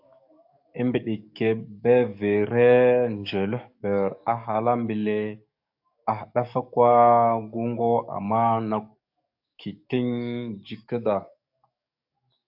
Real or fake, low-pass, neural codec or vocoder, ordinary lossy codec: fake; 5.4 kHz; codec, 44.1 kHz, 7.8 kbps, DAC; AAC, 48 kbps